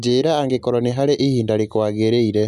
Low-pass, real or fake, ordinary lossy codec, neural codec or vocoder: 14.4 kHz; real; none; none